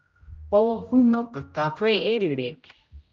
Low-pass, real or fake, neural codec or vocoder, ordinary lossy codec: 7.2 kHz; fake; codec, 16 kHz, 0.5 kbps, X-Codec, HuBERT features, trained on general audio; Opus, 24 kbps